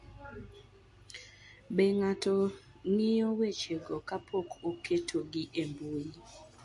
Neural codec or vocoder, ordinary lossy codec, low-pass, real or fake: none; MP3, 48 kbps; 10.8 kHz; real